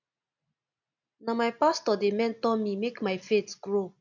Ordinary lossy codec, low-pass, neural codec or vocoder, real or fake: none; 7.2 kHz; none; real